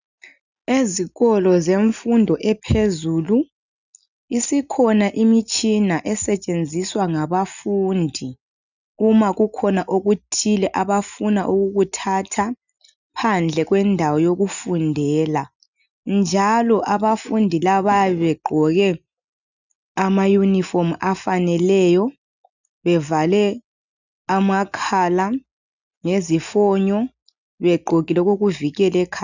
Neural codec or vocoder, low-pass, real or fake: none; 7.2 kHz; real